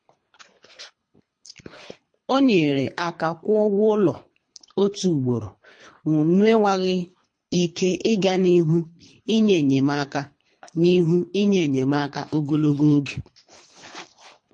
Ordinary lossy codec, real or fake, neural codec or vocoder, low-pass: MP3, 48 kbps; fake; codec, 24 kHz, 3 kbps, HILCodec; 9.9 kHz